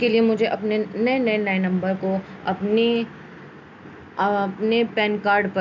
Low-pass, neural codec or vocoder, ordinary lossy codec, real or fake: 7.2 kHz; none; MP3, 64 kbps; real